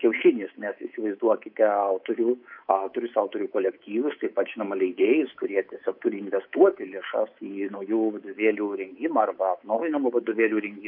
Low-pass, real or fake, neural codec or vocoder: 5.4 kHz; fake; codec, 24 kHz, 3.1 kbps, DualCodec